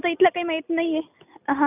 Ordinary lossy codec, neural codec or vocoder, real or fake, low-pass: none; none; real; 3.6 kHz